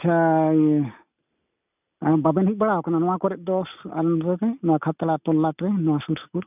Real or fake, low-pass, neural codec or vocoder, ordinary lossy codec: real; 3.6 kHz; none; none